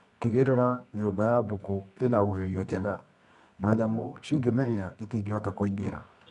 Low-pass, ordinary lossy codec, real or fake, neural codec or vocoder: 10.8 kHz; none; fake; codec, 24 kHz, 0.9 kbps, WavTokenizer, medium music audio release